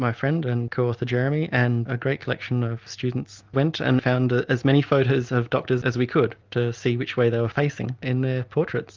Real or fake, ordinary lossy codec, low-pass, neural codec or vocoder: real; Opus, 24 kbps; 7.2 kHz; none